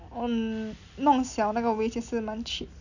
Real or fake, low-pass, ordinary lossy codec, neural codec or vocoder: real; 7.2 kHz; none; none